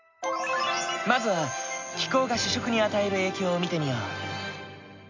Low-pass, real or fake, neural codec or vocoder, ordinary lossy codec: 7.2 kHz; real; none; MP3, 64 kbps